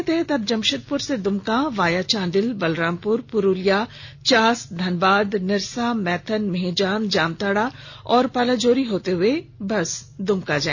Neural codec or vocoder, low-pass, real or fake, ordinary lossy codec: none; 7.2 kHz; real; none